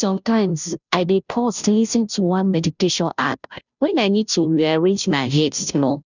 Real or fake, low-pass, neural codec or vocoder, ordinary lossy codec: fake; 7.2 kHz; codec, 16 kHz, 0.5 kbps, FunCodec, trained on Chinese and English, 25 frames a second; none